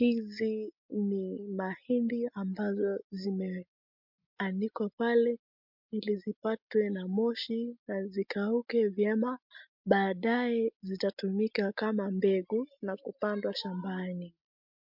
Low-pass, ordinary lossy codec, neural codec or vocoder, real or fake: 5.4 kHz; MP3, 48 kbps; none; real